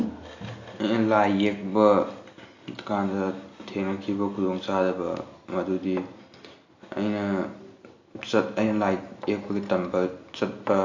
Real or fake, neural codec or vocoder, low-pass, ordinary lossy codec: real; none; 7.2 kHz; AAC, 48 kbps